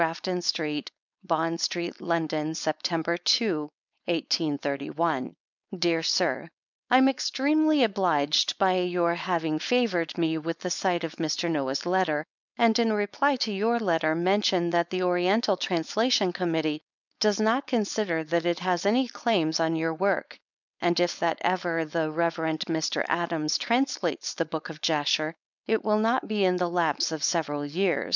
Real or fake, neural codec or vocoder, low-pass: fake; codec, 16 kHz, 4.8 kbps, FACodec; 7.2 kHz